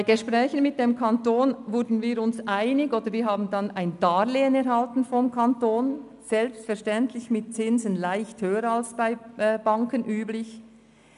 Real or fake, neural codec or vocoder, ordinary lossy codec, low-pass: real; none; none; 10.8 kHz